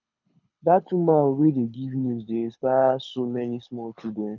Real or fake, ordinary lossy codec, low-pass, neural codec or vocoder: fake; none; 7.2 kHz; codec, 24 kHz, 6 kbps, HILCodec